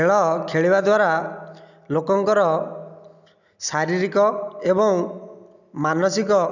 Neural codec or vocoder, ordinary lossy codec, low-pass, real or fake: none; none; 7.2 kHz; real